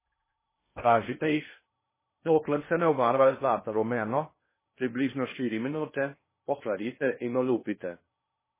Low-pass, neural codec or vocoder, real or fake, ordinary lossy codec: 3.6 kHz; codec, 16 kHz in and 24 kHz out, 0.6 kbps, FocalCodec, streaming, 2048 codes; fake; MP3, 16 kbps